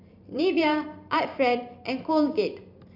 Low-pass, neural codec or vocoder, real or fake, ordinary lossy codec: 5.4 kHz; vocoder, 44.1 kHz, 128 mel bands every 512 samples, BigVGAN v2; fake; none